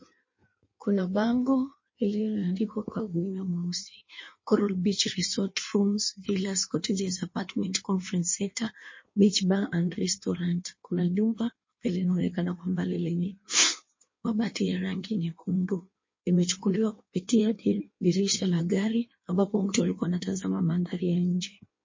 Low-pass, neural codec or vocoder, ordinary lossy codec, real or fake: 7.2 kHz; codec, 16 kHz in and 24 kHz out, 1.1 kbps, FireRedTTS-2 codec; MP3, 32 kbps; fake